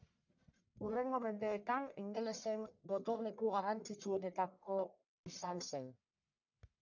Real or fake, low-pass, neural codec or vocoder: fake; 7.2 kHz; codec, 44.1 kHz, 1.7 kbps, Pupu-Codec